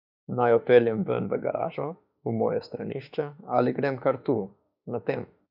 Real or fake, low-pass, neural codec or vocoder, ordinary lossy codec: fake; 5.4 kHz; autoencoder, 48 kHz, 32 numbers a frame, DAC-VAE, trained on Japanese speech; none